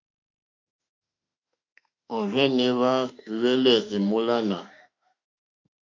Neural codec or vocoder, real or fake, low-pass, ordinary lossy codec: autoencoder, 48 kHz, 32 numbers a frame, DAC-VAE, trained on Japanese speech; fake; 7.2 kHz; MP3, 48 kbps